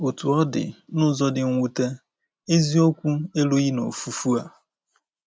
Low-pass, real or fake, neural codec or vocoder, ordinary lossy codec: none; real; none; none